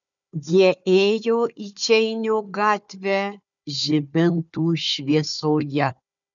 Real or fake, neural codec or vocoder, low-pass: fake; codec, 16 kHz, 4 kbps, FunCodec, trained on Chinese and English, 50 frames a second; 7.2 kHz